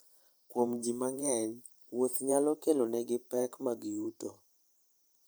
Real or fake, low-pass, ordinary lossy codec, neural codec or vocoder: fake; none; none; vocoder, 44.1 kHz, 128 mel bands every 512 samples, BigVGAN v2